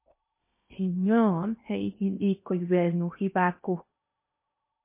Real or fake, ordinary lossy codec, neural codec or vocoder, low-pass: fake; MP3, 24 kbps; codec, 16 kHz in and 24 kHz out, 0.8 kbps, FocalCodec, streaming, 65536 codes; 3.6 kHz